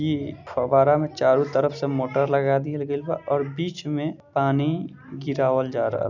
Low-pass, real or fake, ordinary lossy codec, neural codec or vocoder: 7.2 kHz; real; none; none